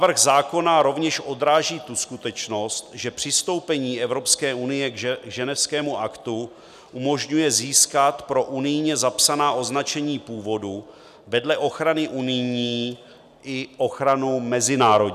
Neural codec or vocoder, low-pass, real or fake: none; 14.4 kHz; real